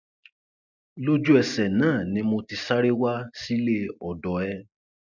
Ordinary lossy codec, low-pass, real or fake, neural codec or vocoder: none; 7.2 kHz; real; none